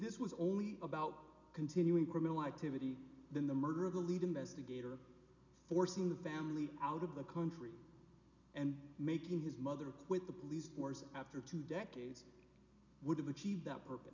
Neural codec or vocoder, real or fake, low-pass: none; real; 7.2 kHz